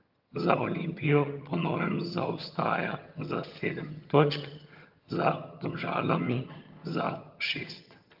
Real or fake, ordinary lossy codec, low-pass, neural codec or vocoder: fake; Opus, 24 kbps; 5.4 kHz; vocoder, 22.05 kHz, 80 mel bands, HiFi-GAN